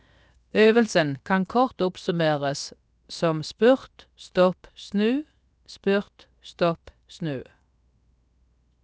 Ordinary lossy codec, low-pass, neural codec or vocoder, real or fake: none; none; codec, 16 kHz, 0.7 kbps, FocalCodec; fake